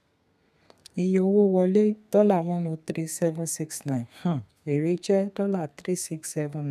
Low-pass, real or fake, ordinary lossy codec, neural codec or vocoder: 14.4 kHz; fake; none; codec, 32 kHz, 1.9 kbps, SNAC